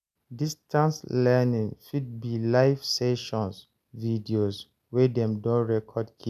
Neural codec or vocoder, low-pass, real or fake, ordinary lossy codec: none; 14.4 kHz; real; none